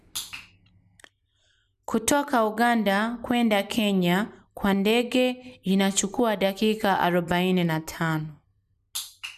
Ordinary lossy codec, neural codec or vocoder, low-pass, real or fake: none; none; 14.4 kHz; real